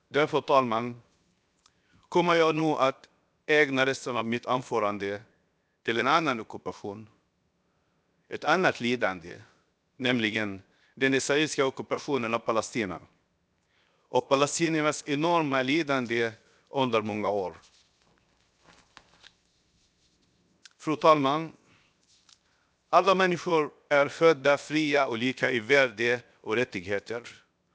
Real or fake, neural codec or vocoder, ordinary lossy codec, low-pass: fake; codec, 16 kHz, 0.7 kbps, FocalCodec; none; none